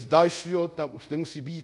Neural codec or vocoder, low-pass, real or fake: codec, 24 kHz, 0.5 kbps, DualCodec; 10.8 kHz; fake